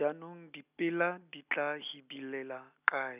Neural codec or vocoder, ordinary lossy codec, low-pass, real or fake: none; none; 3.6 kHz; real